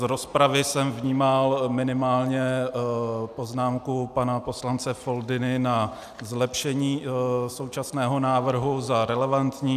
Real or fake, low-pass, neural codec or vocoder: real; 14.4 kHz; none